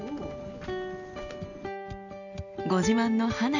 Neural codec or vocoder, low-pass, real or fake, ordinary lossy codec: none; 7.2 kHz; real; none